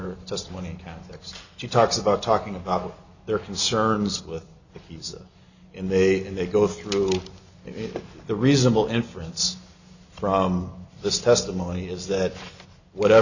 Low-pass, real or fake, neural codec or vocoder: 7.2 kHz; real; none